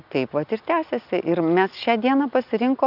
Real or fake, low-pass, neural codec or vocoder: real; 5.4 kHz; none